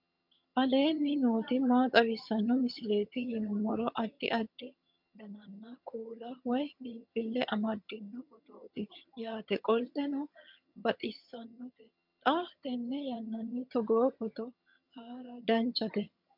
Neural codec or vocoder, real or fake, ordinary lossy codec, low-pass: vocoder, 22.05 kHz, 80 mel bands, HiFi-GAN; fake; MP3, 48 kbps; 5.4 kHz